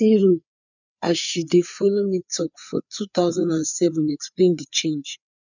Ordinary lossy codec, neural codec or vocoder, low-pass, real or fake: none; codec, 16 kHz, 4 kbps, FreqCodec, larger model; 7.2 kHz; fake